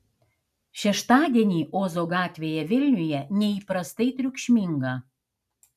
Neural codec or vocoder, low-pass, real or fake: none; 14.4 kHz; real